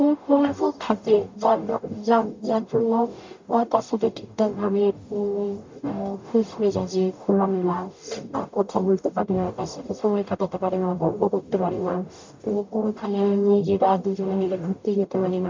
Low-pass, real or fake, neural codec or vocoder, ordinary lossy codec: 7.2 kHz; fake; codec, 44.1 kHz, 0.9 kbps, DAC; MP3, 64 kbps